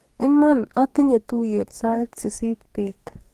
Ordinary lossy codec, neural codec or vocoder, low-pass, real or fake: Opus, 24 kbps; codec, 44.1 kHz, 2.6 kbps, DAC; 14.4 kHz; fake